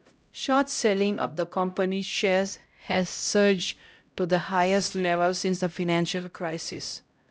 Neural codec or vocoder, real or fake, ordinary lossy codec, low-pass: codec, 16 kHz, 0.5 kbps, X-Codec, HuBERT features, trained on LibriSpeech; fake; none; none